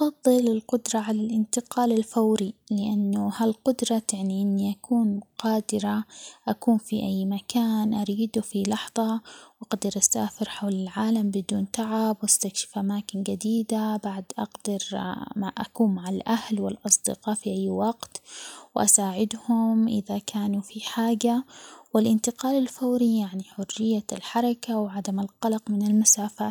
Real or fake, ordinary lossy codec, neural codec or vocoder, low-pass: real; none; none; none